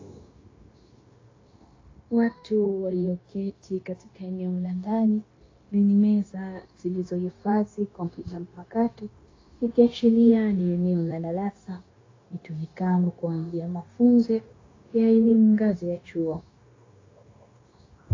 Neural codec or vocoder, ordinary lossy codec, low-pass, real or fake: codec, 16 kHz, 0.9 kbps, LongCat-Audio-Codec; AAC, 32 kbps; 7.2 kHz; fake